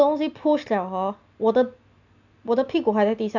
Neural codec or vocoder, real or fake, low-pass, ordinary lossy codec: none; real; 7.2 kHz; none